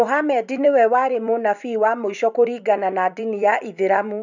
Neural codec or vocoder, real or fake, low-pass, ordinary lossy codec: vocoder, 44.1 kHz, 128 mel bands every 256 samples, BigVGAN v2; fake; 7.2 kHz; none